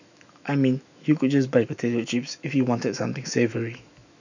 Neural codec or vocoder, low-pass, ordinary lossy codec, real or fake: autoencoder, 48 kHz, 128 numbers a frame, DAC-VAE, trained on Japanese speech; 7.2 kHz; none; fake